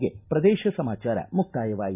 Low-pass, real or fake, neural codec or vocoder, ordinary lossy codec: 3.6 kHz; real; none; none